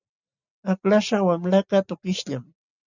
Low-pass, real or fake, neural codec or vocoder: 7.2 kHz; real; none